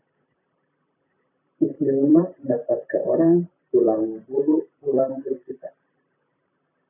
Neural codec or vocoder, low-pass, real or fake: vocoder, 44.1 kHz, 128 mel bands, Pupu-Vocoder; 3.6 kHz; fake